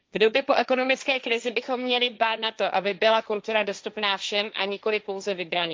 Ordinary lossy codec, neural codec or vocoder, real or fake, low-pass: none; codec, 16 kHz, 1.1 kbps, Voila-Tokenizer; fake; none